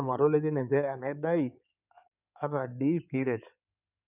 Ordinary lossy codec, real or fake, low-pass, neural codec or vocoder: none; fake; 3.6 kHz; codec, 16 kHz in and 24 kHz out, 2.2 kbps, FireRedTTS-2 codec